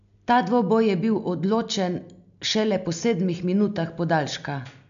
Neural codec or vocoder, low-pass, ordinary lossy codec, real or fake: none; 7.2 kHz; none; real